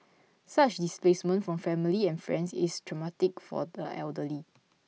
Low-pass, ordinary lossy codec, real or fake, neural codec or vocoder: none; none; real; none